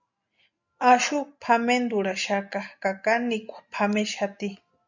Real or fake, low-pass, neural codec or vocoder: real; 7.2 kHz; none